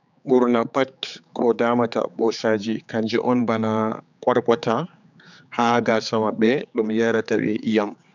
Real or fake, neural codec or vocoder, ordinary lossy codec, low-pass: fake; codec, 16 kHz, 4 kbps, X-Codec, HuBERT features, trained on general audio; none; 7.2 kHz